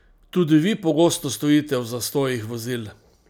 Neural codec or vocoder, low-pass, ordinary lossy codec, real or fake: none; none; none; real